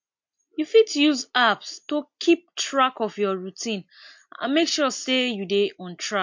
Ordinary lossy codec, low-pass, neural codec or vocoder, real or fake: MP3, 48 kbps; 7.2 kHz; none; real